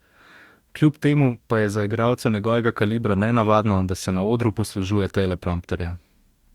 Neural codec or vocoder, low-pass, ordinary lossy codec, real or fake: codec, 44.1 kHz, 2.6 kbps, DAC; 19.8 kHz; Opus, 64 kbps; fake